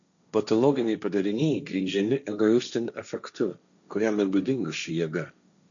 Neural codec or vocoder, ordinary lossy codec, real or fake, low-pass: codec, 16 kHz, 1.1 kbps, Voila-Tokenizer; MP3, 96 kbps; fake; 7.2 kHz